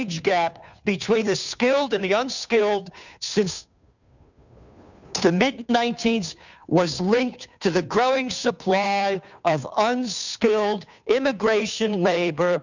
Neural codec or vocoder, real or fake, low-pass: codec, 16 kHz, 2 kbps, FunCodec, trained on Chinese and English, 25 frames a second; fake; 7.2 kHz